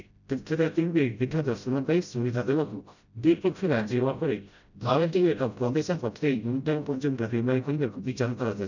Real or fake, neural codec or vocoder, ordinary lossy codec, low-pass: fake; codec, 16 kHz, 0.5 kbps, FreqCodec, smaller model; none; 7.2 kHz